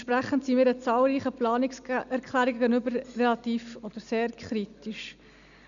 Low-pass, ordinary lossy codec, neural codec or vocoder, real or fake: 7.2 kHz; none; none; real